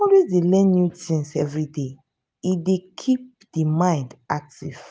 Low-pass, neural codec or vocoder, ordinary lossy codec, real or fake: none; none; none; real